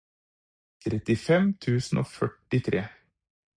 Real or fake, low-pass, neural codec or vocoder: real; 9.9 kHz; none